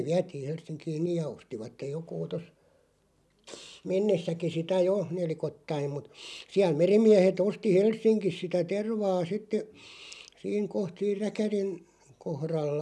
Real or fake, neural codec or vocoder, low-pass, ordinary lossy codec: real; none; none; none